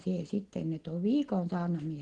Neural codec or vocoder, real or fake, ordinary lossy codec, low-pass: none; real; Opus, 16 kbps; 9.9 kHz